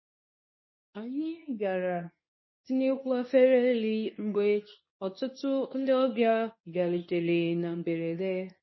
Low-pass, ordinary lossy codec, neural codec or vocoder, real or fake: 7.2 kHz; MP3, 24 kbps; codec, 24 kHz, 0.9 kbps, WavTokenizer, medium speech release version 2; fake